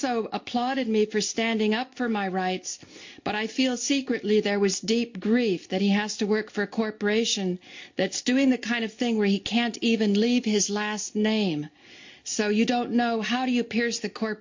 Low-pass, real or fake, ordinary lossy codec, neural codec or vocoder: 7.2 kHz; real; MP3, 48 kbps; none